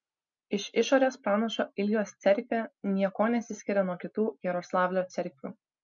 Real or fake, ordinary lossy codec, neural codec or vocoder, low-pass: real; MP3, 64 kbps; none; 7.2 kHz